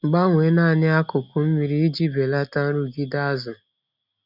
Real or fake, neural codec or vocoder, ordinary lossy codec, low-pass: real; none; none; 5.4 kHz